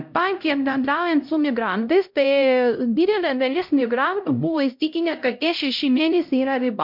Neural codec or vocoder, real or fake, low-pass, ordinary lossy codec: codec, 16 kHz, 0.5 kbps, X-Codec, HuBERT features, trained on LibriSpeech; fake; 5.4 kHz; MP3, 48 kbps